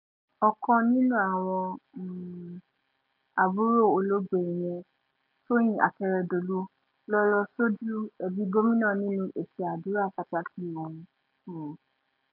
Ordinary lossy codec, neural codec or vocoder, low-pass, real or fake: none; none; 5.4 kHz; real